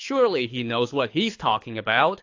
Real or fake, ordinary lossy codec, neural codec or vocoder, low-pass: fake; AAC, 48 kbps; codec, 24 kHz, 6 kbps, HILCodec; 7.2 kHz